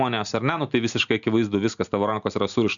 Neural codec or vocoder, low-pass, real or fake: none; 7.2 kHz; real